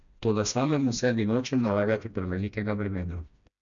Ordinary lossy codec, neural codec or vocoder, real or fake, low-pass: none; codec, 16 kHz, 1 kbps, FreqCodec, smaller model; fake; 7.2 kHz